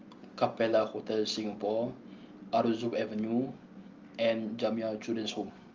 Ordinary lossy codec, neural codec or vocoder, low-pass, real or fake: Opus, 32 kbps; none; 7.2 kHz; real